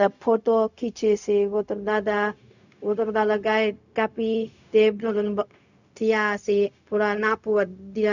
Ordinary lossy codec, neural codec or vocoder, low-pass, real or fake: none; codec, 16 kHz, 0.4 kbps, LongCat-Audio-Codec; 7.2 kHz; fake